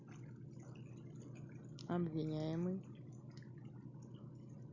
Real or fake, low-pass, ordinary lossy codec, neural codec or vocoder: real; 7.2 kHz; none; none